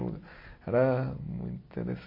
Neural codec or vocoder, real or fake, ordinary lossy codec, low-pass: none; real; MP3, 24 kbps; 5.4 kHz